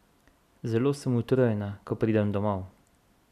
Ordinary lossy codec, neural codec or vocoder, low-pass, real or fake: none; none; 14.4 kHz; real